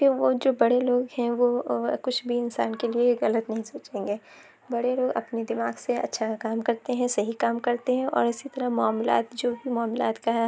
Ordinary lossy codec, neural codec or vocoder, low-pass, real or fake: none; none; none; real